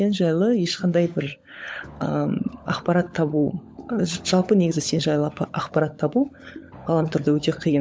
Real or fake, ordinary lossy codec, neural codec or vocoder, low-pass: fake; none; codec, 16 kHz, 16 kbps, FunCodec, trained on LibriTTS, 50 frames a second; none